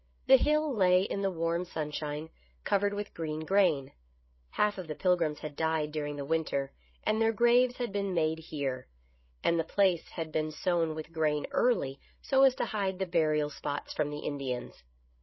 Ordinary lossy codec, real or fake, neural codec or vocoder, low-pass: MP3, 24 kbps; fake; codec, 16 kHz, 8 kbps, FreqCodec, larger model; 7.2 kHz